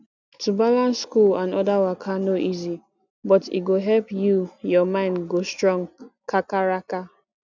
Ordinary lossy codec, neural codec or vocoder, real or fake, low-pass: none; none; real; 7.2 kHz